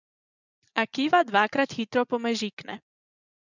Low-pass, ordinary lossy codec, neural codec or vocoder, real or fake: 7.2 kHz; none; vocoder, 24 kHz, 100 mel bands, Vocos; fake